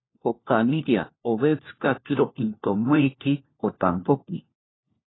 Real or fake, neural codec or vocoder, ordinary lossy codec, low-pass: fake; codec, 16 kHz, 1 kbps, FunCodec, trained on LibriTTS, 50 frames a second; AAC, 16 kbps; 7.2 kHz